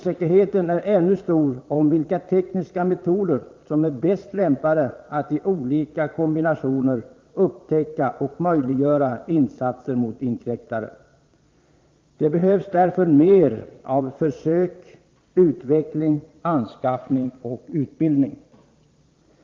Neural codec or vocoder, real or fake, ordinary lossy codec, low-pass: vocoder, 22.05 kHz, 80 mel bands, Vocos; fake; Opus, 32 kbps; 7.2 kHz